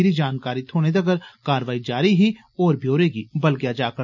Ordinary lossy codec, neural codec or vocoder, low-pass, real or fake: none; none; 7.2 kHz; real